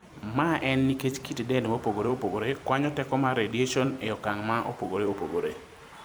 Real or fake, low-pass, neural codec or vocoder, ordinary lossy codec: fake; none; vocoder, 44.1 kHz, 128 mel bands every 256 samples, BigVGAN v2; none